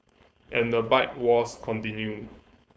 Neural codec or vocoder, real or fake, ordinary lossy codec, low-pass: codec, 16 kHz, 4.8 kbps, FACodec; fake; none; none